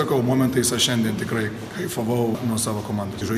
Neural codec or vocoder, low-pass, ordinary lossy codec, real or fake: none; 14.4 kHz; MP3, 96 kbps; real